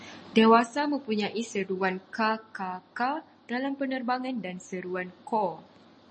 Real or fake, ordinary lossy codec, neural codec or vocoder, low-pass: real; MP3, 32 kbps; none; 10.8 kHz